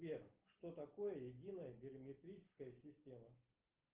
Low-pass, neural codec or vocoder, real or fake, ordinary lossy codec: 3.6 kHz; none; real; Opus, 16 kbps